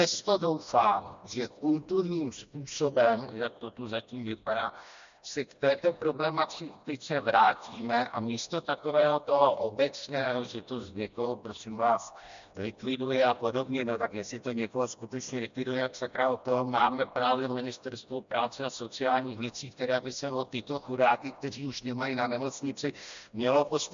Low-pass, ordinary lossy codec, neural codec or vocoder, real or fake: 7.2 kHz; MP3, 64 kbps; codec, 16 kHz, 1 kbps, FreqCodec, smaller model; fake